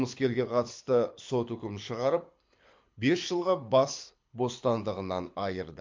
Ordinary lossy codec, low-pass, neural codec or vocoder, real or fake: MP3, 48 kbps; 7.2 kHz; codec, 24 kHz, 6 kbps, HILCodec; fake